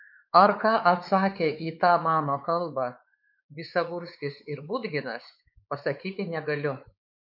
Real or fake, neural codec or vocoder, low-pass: fake; codec, 16 kHz, 4 kbps, X-Codec, WavLM features, trained on Multilingual LibriSpeech; 5.4 kHz